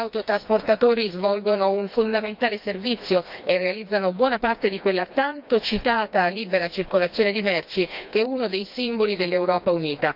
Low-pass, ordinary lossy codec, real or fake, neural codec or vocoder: 5.4 kHz; none; fake; codec, 16 kHz, 2 kbps, FreqCodec, smaller model